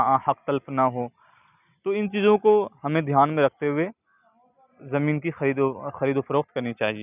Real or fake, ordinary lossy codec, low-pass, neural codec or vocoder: real; none; 3.6 kHz; none